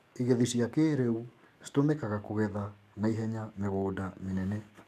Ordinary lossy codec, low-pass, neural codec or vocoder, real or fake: none; 14.4 kHz; codec, 44.1 kHz, 7.8 kbps, DAC; fake